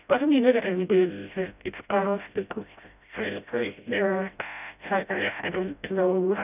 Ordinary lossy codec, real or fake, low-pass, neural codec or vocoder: none; fake; 3.6 kHz; codec, 16 kHz, 0.5 kbps, FreqCodec, smaller model